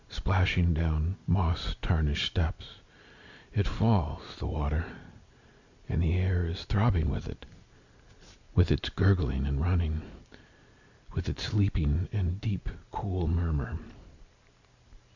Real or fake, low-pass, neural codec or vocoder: real; 7.2 kHz; none